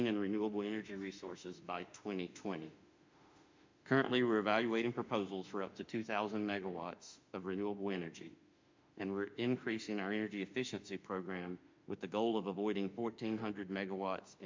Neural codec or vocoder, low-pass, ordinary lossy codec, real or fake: autoencoder, 48 kHz, 32 numbers a frame, DAC-VAE, trained on Japanese speech; 7.2 kHz; MP3, 64 kbps; fake